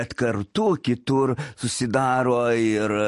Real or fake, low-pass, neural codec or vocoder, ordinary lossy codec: real; 14.4 kHz; none; MP3, 48 kbps